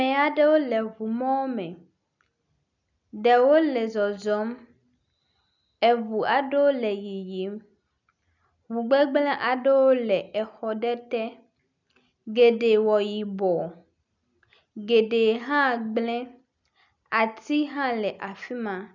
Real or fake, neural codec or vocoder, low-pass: real; none; 7.2 kHz